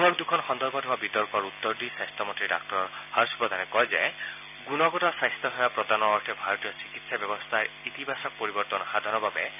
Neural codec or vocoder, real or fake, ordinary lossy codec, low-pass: none; real; none; 3.6 kHz